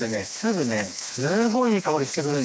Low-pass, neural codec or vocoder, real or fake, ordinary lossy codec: none; codec, 16 kHz, 2 kbps, FreqCodec, smaller model; fake; none